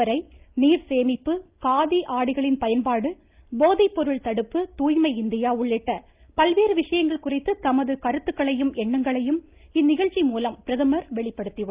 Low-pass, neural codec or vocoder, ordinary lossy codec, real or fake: 3.6 kHz; none; Opus, 32 kbps; real